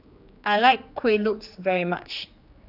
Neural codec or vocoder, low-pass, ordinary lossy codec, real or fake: codec, 16 kHz, 2 kbps, X-Codec, HuBERT features, trained on general audio; 5.4 kHz; none; fake